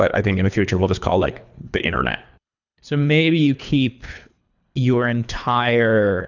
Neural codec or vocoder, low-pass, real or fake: codec, 24 kHz, 3 kbps, HILCodec; 7.2 kHz; fake